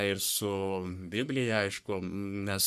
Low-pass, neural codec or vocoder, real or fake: 14.4 kHz; codec, 44.1 kHz, 3.4 kbps, Pupu-Codec; fake